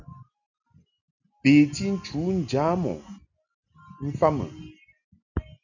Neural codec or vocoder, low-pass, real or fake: none; 7.2 kHz; real